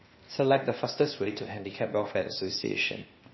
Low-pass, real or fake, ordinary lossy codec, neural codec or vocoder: 7.2 kHz; fake; MP3, 24 kbps; codec, 16 kHz, 0.8 kbps, ZipCodec